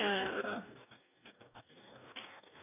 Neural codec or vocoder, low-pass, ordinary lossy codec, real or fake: codec, 44.1 kHz, 2.6 kbps, DAC; 3.6 kHz; none; fake